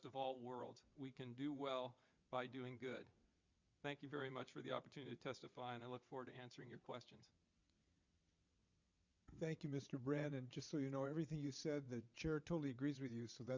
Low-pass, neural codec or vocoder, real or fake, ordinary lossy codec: 7.2 kHz; vocoder, 22.05 kHz, 80 mel bands, WaveNeXt; fake; Opus, 64 kbps